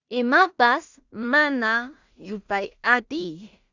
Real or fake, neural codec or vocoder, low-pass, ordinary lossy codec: fake; codec, 16 kHz in and 24 kHz out, 0.4 kbps, LongCat-Audio-Codec, two codebook decoder; 7.2 kHz; Opus, 64 kbps